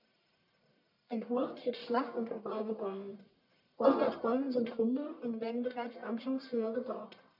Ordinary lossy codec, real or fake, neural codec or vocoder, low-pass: none; fake; codec, 44.1 kHz, 1.7 kbps, Pupu-Codec; 5.4 kHz